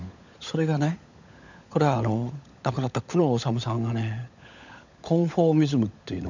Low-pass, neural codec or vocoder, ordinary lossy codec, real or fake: 7.2 kHz; codec, 16 kHz, 16 kbps, FunCodec, trained on LibriTTS, 50 frames a second; none; fake